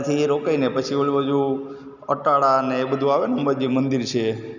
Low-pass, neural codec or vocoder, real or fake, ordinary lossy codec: 7.2 kHz; none; real; none